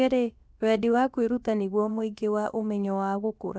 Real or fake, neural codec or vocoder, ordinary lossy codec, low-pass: fake; codec, 16 kHz, about 1 kbps, DyCAST, with the encoder's durations; none; none